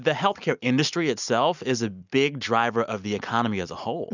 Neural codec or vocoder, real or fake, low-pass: none; real; 7.2 kHz